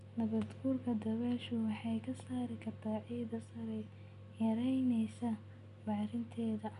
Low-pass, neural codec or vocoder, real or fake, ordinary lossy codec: 10.8 kHz; none; real; none